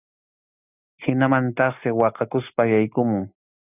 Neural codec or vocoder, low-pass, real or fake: none; 3.6 kHz; real